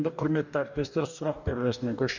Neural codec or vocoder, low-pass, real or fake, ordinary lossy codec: codec, 44.1 kHz, 2.6 kbps, DAC; 7.2 kHz; fake; none